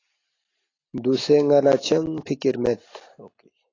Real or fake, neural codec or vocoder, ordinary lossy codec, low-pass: real; none; AAC, 32 kbps; 7.2 kHz